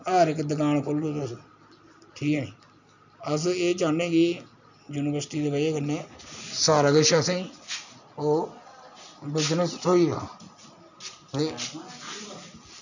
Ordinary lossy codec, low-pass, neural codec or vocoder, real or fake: none; 7.2 kHz; none; real